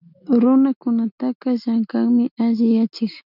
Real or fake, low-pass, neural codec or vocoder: real; 5.4 kHz; none